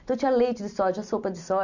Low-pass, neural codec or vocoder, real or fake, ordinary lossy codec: 7.2 kHz; none; real; none